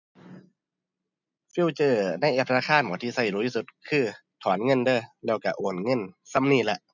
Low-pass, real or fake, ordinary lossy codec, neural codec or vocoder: 7.2 kHz; real; none; none